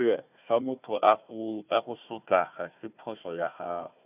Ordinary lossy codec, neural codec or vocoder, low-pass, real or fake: none; codec, 16 kHz, 1 kbps, FunCodec, trained on Chinese and English, 50 frames a second; 3.6 kHz; fake